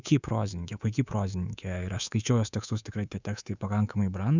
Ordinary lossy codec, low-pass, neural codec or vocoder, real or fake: Opus, 64 kbps; 7.2 kHz; codec, 24 kHz, 3.1 kbps, DualCodec; fake